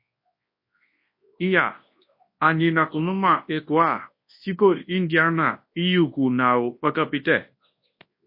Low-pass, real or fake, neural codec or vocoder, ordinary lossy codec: 5.4 kHz; fake; codec, 24 kHz, 0.9 kbps, WavTokenizer, large speech release; MP3, 32 kbps